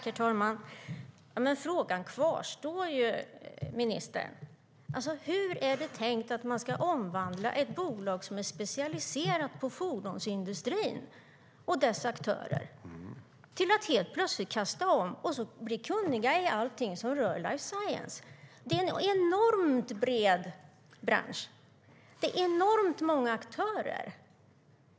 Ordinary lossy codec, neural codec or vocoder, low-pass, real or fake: none; none; none; real